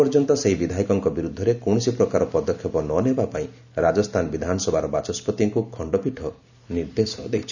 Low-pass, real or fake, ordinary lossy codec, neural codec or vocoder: 7.2 kHz; real; none; none